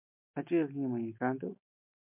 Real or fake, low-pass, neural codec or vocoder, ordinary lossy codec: real; 3.6 kHz; none; MP3, 32 kbps